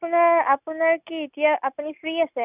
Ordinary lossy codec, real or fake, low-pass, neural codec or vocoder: none; real; 3.6 kHz; none